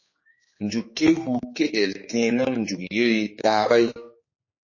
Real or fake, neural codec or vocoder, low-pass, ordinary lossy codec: fake; codec, 16 kHz, 4 kbps, X-Codec, HuBERT features, trained on general audio; 7.2 kHz; MP3, 32 kbps